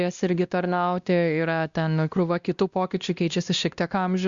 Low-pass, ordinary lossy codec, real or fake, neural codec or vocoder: 7.2 kHz; Opus, 64 kbps; fake; codec, 16 kHz, 1 kbps, X-Codec, WavLM features, trained on Multilingual LibriSpeech